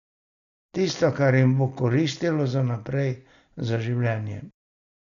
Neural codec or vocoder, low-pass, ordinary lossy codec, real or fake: none; 7.2 kHz; none; real